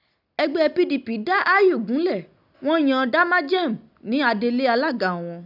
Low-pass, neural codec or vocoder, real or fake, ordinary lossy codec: 5.4 kHz; none; real; none